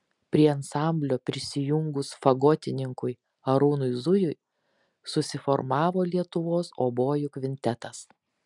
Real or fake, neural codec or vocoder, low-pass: real; none; 10.8 kHz